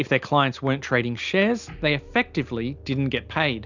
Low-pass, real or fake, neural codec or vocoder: 7.2 kHz; real; none